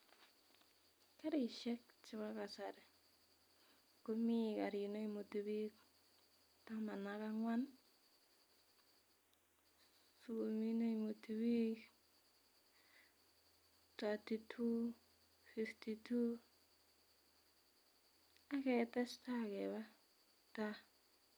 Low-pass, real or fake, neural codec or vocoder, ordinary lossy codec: none; real; none; none